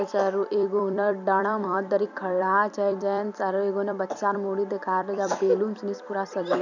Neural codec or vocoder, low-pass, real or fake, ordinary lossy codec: vocoder, 44.1 kHz, 128 mel bands every 256 samples, BigVGAN v2; 7.2 kHz; fake; none